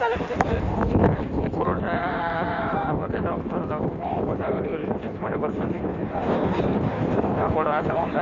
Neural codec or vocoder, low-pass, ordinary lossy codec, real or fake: codec, 16 kHz in and 24 kHz out, 1.1 kbps, FireRedTTS-2 codec; 7.2 kHz; none; fake